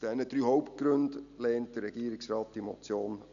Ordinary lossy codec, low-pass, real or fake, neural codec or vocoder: none; 7.2 kHz; real; none